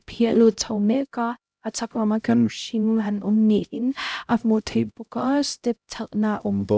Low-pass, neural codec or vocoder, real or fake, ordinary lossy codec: none; codec, 16 kHz, 0.5 kbps, X-Codec, HuBERT features, trained on LibriSpeech; fake; none